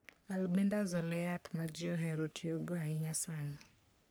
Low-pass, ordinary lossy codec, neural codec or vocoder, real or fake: none; none; codec, 44.1 kHz, 3.4 kbps, Pupu-Codec; fake